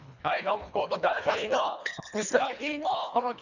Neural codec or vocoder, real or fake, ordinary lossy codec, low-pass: codec, 24 kHz, 1.5 kbps, HILCodec; fake; none; 7.2 kHz